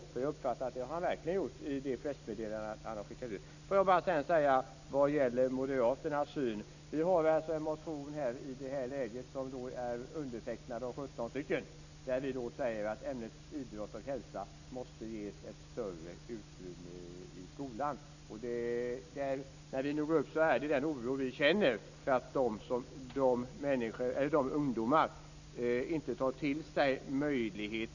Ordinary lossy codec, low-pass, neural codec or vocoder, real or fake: none; 7.2 kHz; none; real